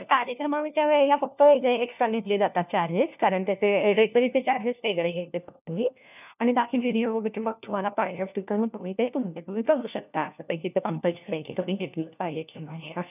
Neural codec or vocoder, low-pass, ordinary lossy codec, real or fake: codec, 16 kHz, 1 kbps, FunCodec, trained on LibriTTS, 50 frames a second; 3.6 kHz; none; fake